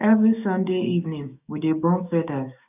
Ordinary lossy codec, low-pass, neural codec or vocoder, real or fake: none; 3.6 kHz; codec, 16 kHz, 6 kbps, DAC; fake